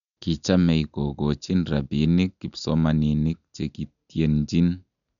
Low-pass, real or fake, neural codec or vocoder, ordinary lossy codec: 7.2 kHz; real; none; none